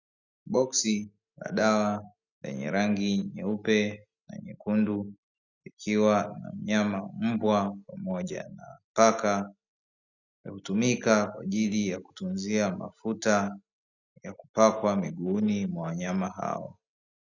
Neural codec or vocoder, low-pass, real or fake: none; 7.2 kHz; real